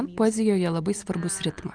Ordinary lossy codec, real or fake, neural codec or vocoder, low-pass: Opus, 32 kbps; real; none; 9.9 kHz